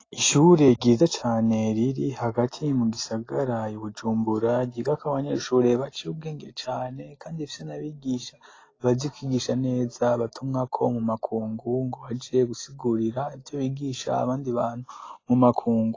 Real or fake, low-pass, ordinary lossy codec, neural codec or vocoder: real; 7.2 kHz; AAC, 32 kbps; none